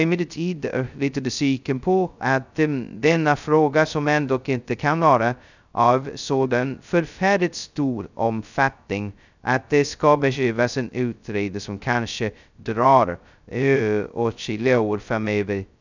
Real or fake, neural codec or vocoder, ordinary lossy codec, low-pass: fake; codec, 16 kHz, 0.2 kbps, FocalCodec; none; 7.2 kHz